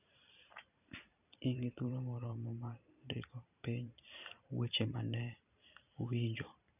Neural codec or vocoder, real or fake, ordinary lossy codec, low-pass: vocoder, 44.1 kHz, 128 mel bands every 512 samples, BigVGAN v2; fake; none; 3.6 kHz